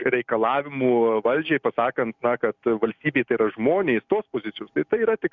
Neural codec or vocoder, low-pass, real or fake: none; 7.2 kHz; real